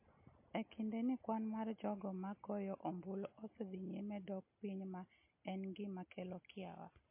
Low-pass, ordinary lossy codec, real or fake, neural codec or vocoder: 3.6 kHz; MP3, 24 kbps; real; none